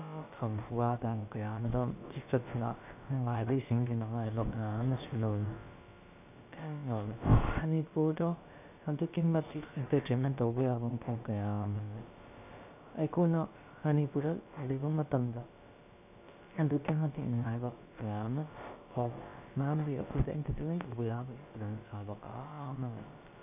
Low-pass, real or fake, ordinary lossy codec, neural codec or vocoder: 3.6 kHz; fake; none; codec, 16 kHz, about 1 kbps, DyCAST, with the encoder's durations